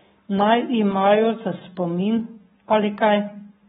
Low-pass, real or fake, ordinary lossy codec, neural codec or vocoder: 19.8 kHz; fake; AAC, 16 kbps; codec, 44.1 kHz, 7.8 kbps, Pupu-Codec